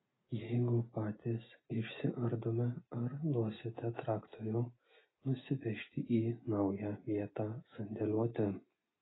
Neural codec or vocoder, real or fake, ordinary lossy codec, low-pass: none; real; AAC, 16 kbps; 7.2 kHz